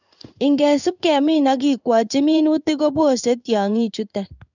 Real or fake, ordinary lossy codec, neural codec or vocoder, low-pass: fake; none; codec, 16 kHz in and 24 kHz out, 1 kbps, XY-Tokenizer; 7.2 kHz